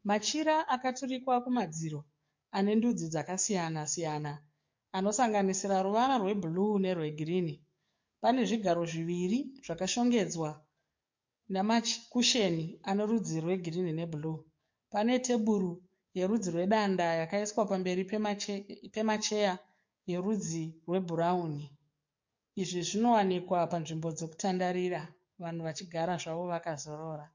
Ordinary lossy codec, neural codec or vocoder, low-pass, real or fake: MP3, 48 kbps; codec, 44.1 kHz, 7.8 kbps, DAC; 7.2 kHz; fake